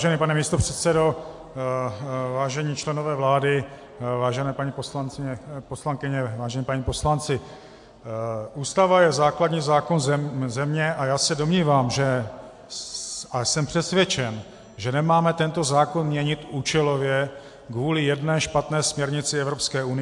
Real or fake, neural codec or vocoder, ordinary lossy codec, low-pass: real; none; AAC, 64 kbps; 10.8 kHz